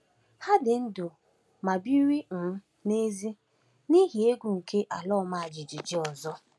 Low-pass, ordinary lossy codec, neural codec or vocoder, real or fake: none; none; none; real